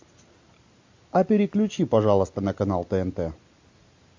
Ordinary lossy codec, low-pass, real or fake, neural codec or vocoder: MP3, 48 kbps; 7.2 kHz; real; none